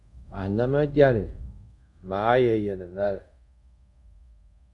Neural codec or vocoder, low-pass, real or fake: codec, 24 kHz, 0.5 kbps, DualCodec; 10.8 kHz; fake